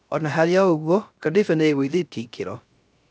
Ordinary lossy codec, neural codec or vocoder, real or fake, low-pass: none; codec, 16 kHz, 0.3 kbps, FocalCodec; fake; none